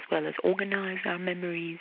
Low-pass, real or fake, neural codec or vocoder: 5.4 kHz; real; none